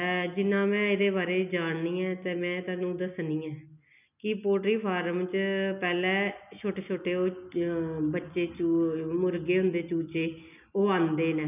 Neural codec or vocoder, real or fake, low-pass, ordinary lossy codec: none; real; 3.6 kHz; none